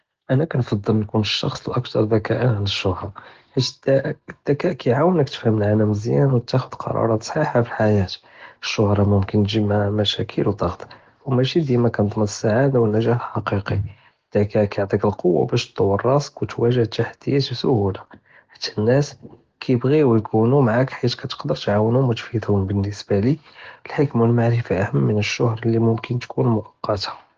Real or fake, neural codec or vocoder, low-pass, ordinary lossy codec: real; none; 7.2 kHz; Opus, 16 kbps